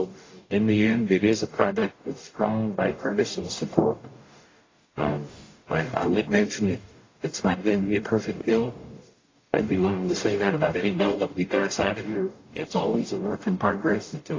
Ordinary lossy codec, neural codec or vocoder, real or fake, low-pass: AAC, 32 kbps; codec, 44.1 kHz, 0.9 kbps, DAC; fake; 7.2 kHz